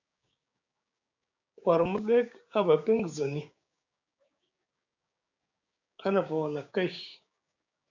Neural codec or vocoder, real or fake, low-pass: codec, 16 kHz, 6 kbps, DAC; fake; 7.2 kHz